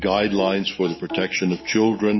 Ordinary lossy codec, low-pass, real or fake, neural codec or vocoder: MP3, 24 kbps; 7.2 kHz; fake; autoencoder, 48 kHz, 128 numbers a frame, DAC-VAE, trained on Japanese speech